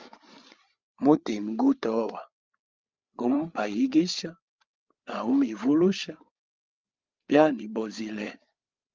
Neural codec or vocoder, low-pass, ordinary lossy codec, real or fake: codec, 16 kHz, 8 kbps, FreqCodec, larger model; 7.2 kHz; Opus, 32 kbps; fake